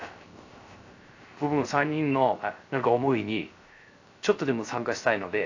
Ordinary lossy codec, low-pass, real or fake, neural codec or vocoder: AAC, 48 kbps; 7.2 kHz; fake; codec, 16 kHz, 0.3 kbps, FocalCodec